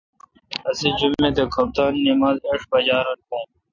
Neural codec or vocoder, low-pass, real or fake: none; 7.2 kHz; real